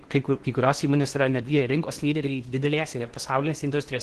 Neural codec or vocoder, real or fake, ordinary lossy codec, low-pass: codec, 16 kHz in and 24 kHz out, 0.8 kbps, FocalCodec, streaming, 65536 codes; fake; Opus, 16 kbps; 10.8 kHz